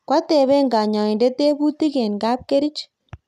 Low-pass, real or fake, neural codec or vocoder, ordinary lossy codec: 14.4 kHz; real; none; none